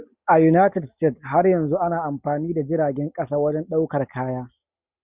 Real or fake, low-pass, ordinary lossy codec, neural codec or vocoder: real; 3.6 kHz; Opus, 64 kbps; none